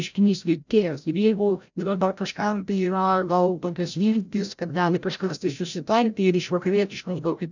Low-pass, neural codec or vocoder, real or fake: 7.2 kHz; codec, 16 kHz, 0.5 kbps, FreqCodec, larger model; fake